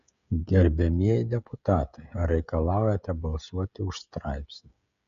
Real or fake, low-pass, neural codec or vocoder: fake; 7.2 kHz; codec, 16 kHz, 16 kbps, FreqCodec, smaller model